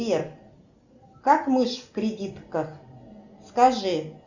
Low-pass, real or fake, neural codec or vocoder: 7.2 kHz; real; none